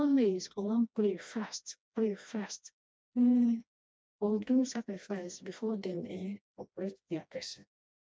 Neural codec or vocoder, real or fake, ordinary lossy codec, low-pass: codec, 16 kHz, 1 kbps, FreqCodec, smaller model; fake; none; none